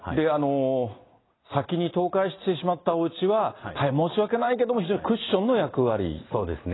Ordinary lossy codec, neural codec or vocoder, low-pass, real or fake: AAC, 16 kbps; none; 7.2 kHz; real